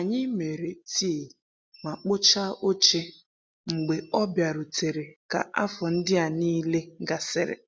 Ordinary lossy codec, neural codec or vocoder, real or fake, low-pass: none; none; real; none